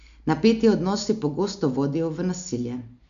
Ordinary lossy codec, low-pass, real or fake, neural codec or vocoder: MP3, 96 kbps; 7.2 kHz; real; none